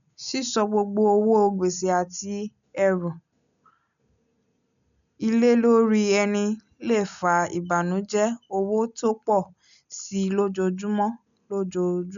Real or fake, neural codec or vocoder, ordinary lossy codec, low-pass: real; none; none; 7.2 kHz